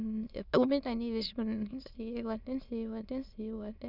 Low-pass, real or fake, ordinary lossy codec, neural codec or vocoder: 5.4 kHz; fake; none; autoencoder, 22.05 kHz, a latent of 192 numbers a frame, VITS, trained on many speakers